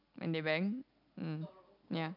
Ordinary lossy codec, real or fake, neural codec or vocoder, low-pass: none; real; none; 5.4 kHz